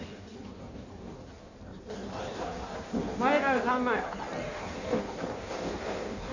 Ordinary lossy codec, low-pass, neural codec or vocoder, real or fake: none; 7.2 kHz; codec, 16 kHz in and 24 kHz out, 1.1 kbps, FireRedTTS-2 codec; fake